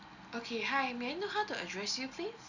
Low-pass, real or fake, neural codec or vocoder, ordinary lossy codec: 7.2 kHz; real; none; none